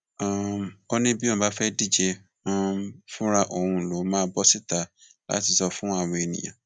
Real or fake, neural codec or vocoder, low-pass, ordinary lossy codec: real; none; 9.9 kHz; none